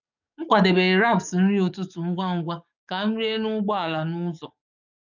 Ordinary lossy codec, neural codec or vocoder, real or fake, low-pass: none; codec, 44.1 kHz, 7.8 kbps, DAC; fake; 7.2 kHz